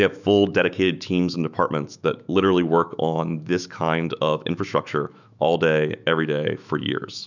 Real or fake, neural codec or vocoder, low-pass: fake; autoencoder, 48 kHz, 128 numbers a frame, DAC-VAE, trained on Japanese speech; 7.2 kHz